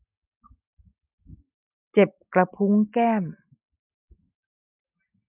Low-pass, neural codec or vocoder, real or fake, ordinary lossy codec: 3.6 kHz; none; real; none